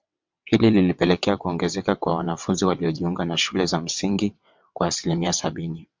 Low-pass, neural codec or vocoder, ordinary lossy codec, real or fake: 7.2 kHz; vocoder, 22.05 kHz, 80 mel bands, WaveNeXt; MP3, 64 kbps; fake